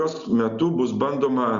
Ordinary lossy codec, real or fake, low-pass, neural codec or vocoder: Opus, 64 kbps; real; 7.2 kHz; none